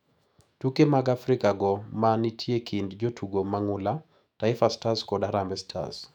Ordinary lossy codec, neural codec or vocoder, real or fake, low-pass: none; autoencoder, 48 kHz, 128 numbers a frame, DAC-VAE, trained on Japanese speech; fake; 19.8 kHz